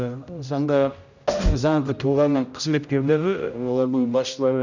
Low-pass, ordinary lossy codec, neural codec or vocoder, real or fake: 7.2 kHz; none; codec, 16 kHz, 0.5 kbps, X-Codec, HuBERT features, trained on general audio; fake